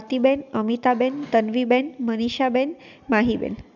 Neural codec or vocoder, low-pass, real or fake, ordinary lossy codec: none; 7.2 kHz; real; none